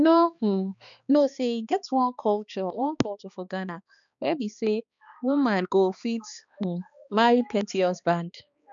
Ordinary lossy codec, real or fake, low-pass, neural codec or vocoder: none; fake; 7.2 kHz; codec, 16 kHz, 2 kbps, X-Codec, HuBERT features, trained on balanced general audio